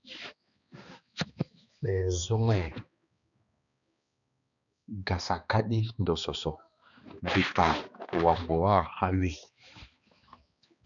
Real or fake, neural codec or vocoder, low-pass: fake; codec, 16 kHz, 2 kbps, X-Codec, HuBERT features, trained on balanced general audio; 7.2 kHz